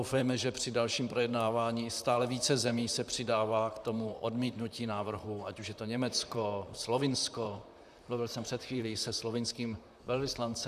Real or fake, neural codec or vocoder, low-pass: fake; vocoder, 44.1 kHz, 128 mel bands, Pupu-Vocoder; 14.4 kHz